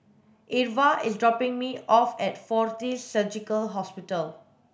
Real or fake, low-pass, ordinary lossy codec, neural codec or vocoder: real; none; none; none